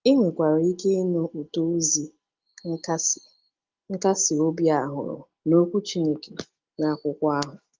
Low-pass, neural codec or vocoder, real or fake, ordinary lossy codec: 7.2 kHz; none; real; Opus, 24 kbps